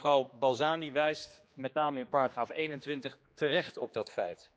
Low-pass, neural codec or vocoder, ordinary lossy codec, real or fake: none; codec, 16 kHz, 2 kbps, X-Codec, HuBERT features, trained on general audio; none; fake